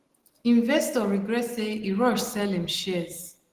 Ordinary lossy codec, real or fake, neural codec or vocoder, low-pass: Opus, 16 kbps; real; none; 14.4 kHz